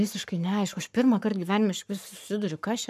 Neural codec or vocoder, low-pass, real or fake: codec, 44.1 kHz, 7.8 kbps, Pupu-Codec; 14.4 kHz; fake